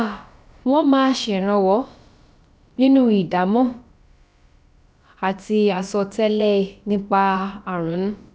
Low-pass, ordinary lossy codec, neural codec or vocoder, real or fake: none; none; codec, 16 kHz, about 1 kbps, DyCAST, with the encoder's durations; fake